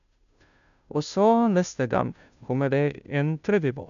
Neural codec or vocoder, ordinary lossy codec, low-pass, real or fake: codec, 16 kHz, 0.5 kbps, FunCodec, trained on Chinese and English, 25 frames a second; none; 7.2 kHz; fake